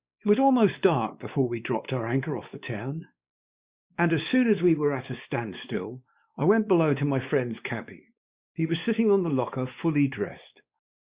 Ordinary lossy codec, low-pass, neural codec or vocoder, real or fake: Opus, 64 kbps; 3.6 kHz; codec, 16 kHz, 2 kbps, X-Codec, WavLM features, trained on Multilingual LibriSpeech; fake